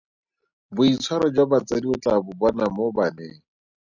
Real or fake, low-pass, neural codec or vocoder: real; 7.2 kHz; none